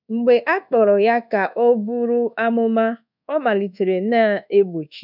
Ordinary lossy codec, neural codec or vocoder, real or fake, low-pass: none; codec, 24 kHz, 1.2 kbps, DualCodec; fake; 5.4 kHz